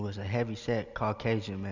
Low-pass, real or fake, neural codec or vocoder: 7.2 kHz; real; none